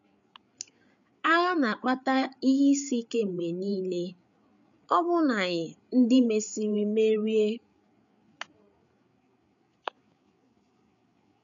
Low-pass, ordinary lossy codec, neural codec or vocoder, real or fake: 7.2 kHz; none; codec, 16 kHz, 8 kbps, FreqCodec, larger model; fake